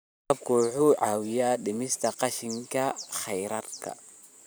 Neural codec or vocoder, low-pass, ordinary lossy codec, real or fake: vocoder, 44.1 kHz, 128 mel bands every 512 samples, BigVGAN v2; none; none; fake